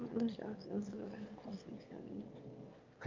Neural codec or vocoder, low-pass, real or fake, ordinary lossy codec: autoencoder, 22.05 kHz, a latent of 192 numbers a frame, VITS, trained on one speaker; 7.2 kHz; fake; Opus, 24 kbps